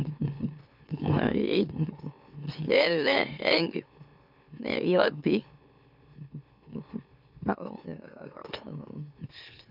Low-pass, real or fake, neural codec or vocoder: 5.4 kHz; fake; autoencoder, 44.1 kHz, a latent of 192 numbers a frame, MeloTTS